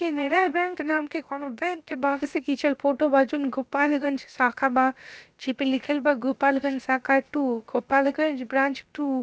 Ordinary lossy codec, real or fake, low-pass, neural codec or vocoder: none; fake; none; codec, 16 kHz, about 1 kbps, DyCAST, with the encoder's durations